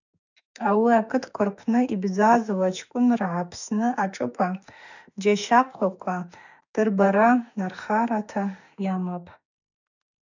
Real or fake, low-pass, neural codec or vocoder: fake; 7.2 kHz; autoencoder, 48 kHz, 32 numbers a frame, DAC-VAE, trained on Japanese speech